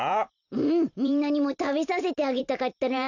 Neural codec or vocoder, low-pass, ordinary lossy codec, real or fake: vocoder, 44.1 kHz, 128 mel bands every 512 samples, BigVGAN v2; 7.2 kHz; none; fake